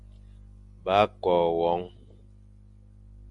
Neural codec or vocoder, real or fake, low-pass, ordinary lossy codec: none; real; 10.8 kHz; MP3, 96 kbps